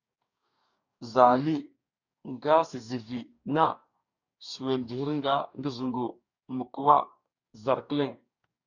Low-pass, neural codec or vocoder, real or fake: 7.2 kHz; codec, 44.1 kHz, 2.6 kbps, DAC; fake